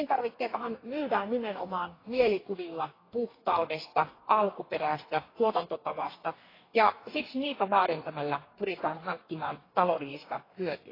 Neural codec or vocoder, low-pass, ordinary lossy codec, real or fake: codec, 44.1 kHz, 2.6 kbps, DAC; 5.4 kHz; AAC, 24 kbps; fake